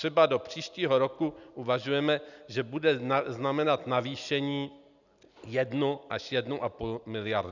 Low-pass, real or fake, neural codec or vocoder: 7.2 kHz; real; none